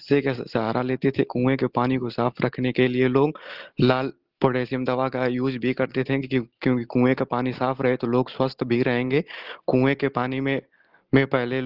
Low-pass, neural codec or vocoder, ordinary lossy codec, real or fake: 5.4 kHz; none; Opus, 16 kbps; real